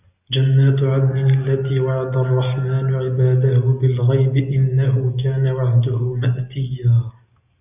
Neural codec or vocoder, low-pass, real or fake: none; 3.6 kHz; real